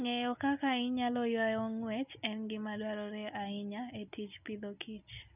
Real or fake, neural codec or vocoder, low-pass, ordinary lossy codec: real; none; 3.6 kHz; none